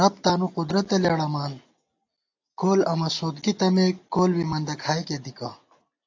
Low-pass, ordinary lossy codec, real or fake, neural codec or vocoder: 7.2 kHz; AAC, 48 kbps; real; none